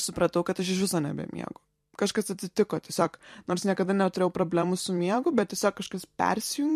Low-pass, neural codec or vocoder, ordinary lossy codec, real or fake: 14.4 kHz; vocoder, 44.1 kHz, 128 mel bands every 256 samples, BigVGAN v2; MP3, 64 kbps; fake